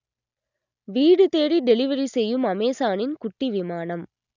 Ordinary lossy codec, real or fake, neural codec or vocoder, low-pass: none; real; none; 7.2 kHz